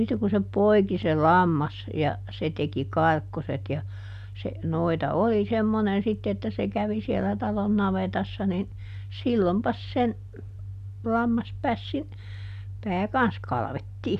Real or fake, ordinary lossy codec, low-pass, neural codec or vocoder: real; none; 14.4 kHz; none